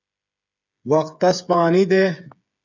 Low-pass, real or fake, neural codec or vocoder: 7.2 kHz; fake; codec, 16 kHz, 8 kbps, FreqCodec, smaller model